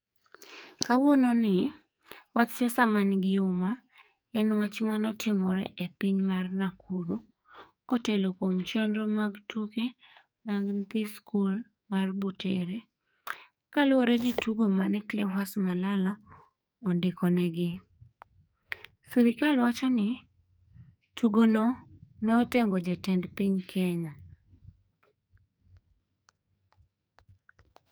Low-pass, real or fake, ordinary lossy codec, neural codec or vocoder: none; fake; none; codec, 44.1 kHz, 2.6 kbps, SNAC